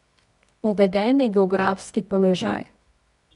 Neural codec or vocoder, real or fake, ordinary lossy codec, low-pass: codec, 24 kHz, 0.9 kbps, WavTokenizer, medium music audio release; fake; none; 10.8 kHz